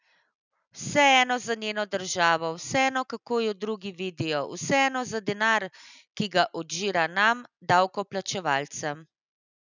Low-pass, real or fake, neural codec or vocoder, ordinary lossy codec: 7.2 kHz; real; none; none